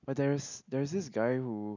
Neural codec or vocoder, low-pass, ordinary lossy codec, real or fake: none; 7.2 kHz; none; real